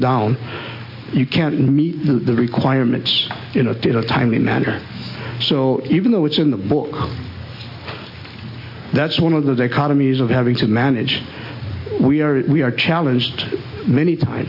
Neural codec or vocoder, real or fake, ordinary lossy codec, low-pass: none; real; MP3, 32 kbps; 5.4 kHz